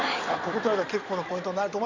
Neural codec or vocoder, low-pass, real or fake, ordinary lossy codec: codec, 16 kHz in and 24 kHz out, 2.2 kbps, FireRedTTS-2 codec; 7.2 kHz; fake; MP3, 64 kbps